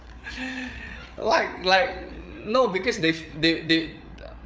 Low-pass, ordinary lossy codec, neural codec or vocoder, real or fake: none; none; codec, 16 kHz, 8 kbps, FreqCodec, larger model; fake